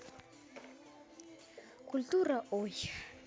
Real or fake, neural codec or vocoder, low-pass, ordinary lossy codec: real; none; none; none